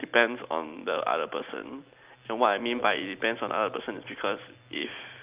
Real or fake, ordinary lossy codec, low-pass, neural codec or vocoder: real; Opus, 24 kbps; 3.6 kHz; none